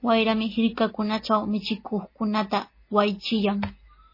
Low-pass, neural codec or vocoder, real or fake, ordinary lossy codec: 5.4 kHz; none; real; MP3, 24 kbps